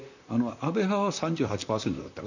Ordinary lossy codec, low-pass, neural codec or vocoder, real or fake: none; 7.2 kHz; vocoder, 44.1 kHz, 128 mel bands, Pupu-Vocoder; fake